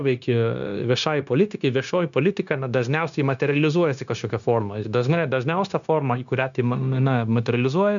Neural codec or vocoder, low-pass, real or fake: codec, 16 kHz, 0.9 kbps, LongCat-Audio-Codec; 7.2 kHz; fake